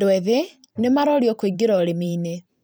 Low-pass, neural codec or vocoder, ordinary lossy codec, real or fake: none; vocoder, 44.1 kHz, 128 mel bands every 512 samples, BigVGAN v2; none; fake